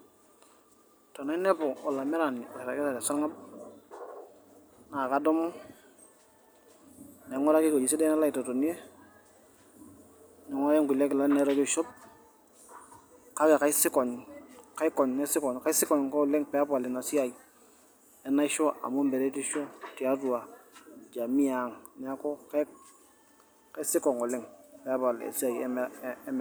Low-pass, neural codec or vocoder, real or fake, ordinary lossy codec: none; none; real; none